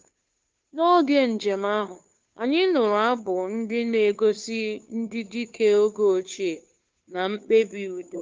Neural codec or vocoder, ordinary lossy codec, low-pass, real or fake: codec, 16 kHz, 2 kbps, FunCodec, trained on Chinese and English, 25 frames a second; Opus, 32 kbps; 7.2 kHz; fake